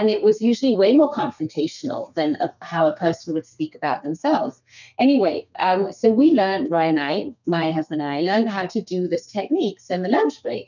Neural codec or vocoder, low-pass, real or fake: codec, 32 kHz, 1.9 kbps, SNAC; 7.2 kHz; fake